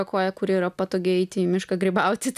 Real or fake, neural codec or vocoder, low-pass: real; none; 14.4 kHz